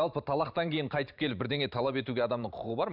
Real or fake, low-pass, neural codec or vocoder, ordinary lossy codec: fake; 5.4 kHz; vocoder, 44.1 kHz, 128 mel bands every 512 samples, BigVGAN v2; none